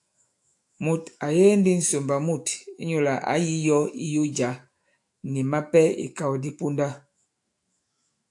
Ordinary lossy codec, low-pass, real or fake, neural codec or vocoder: AAC, 64 kbps; 10.8 kHz; fake; autoencoder, 48 kHz, 128 numbers a frame, DAC-VAE, trained on Japanese speech